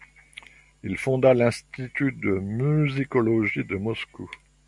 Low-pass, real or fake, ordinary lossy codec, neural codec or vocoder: 10.8 kHz; real; MP3, 64 kbps; none